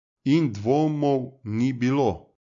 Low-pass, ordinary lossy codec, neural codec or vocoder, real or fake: 7.2 kHz; MP3, 48 kbps; none; real